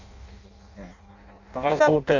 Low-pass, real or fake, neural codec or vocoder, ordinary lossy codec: 7.2 kHz; fake; codec, 16 kHz in and 24 kHz out, 0.6 kbps, FireRedTTS-2 codec; none